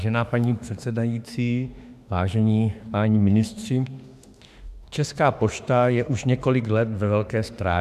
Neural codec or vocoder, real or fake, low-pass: autoencoder, 48 kHz, 32 numbers a frame, DAC-VAE, trained on Japanese speech; fake; 14.4 kHz